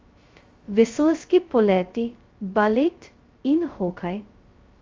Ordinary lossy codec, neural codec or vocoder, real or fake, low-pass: Opus, 32 kbps; codec, 16 kHz, 0.2 kbps, FocalCodec; fake; 7.2 kHz